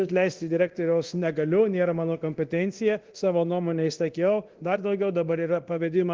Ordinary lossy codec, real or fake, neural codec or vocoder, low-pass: Opus, 16 kbps; fake; codec, 24 kHz, 0.9 kbps, DualCodec; 7.2 kHz